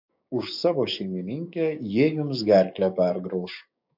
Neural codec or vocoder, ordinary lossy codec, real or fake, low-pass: codec, 16 kHz, 6 kbps, DAC; MP3, 48 kbps; fake; 5.4 kHz